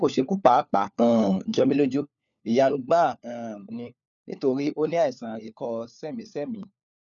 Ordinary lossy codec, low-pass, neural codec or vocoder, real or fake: none; 7.2 kHz; codec, 16 kHz, 4 kbps, FunCodec, trained on LibriTTS, 50 frames a second; fake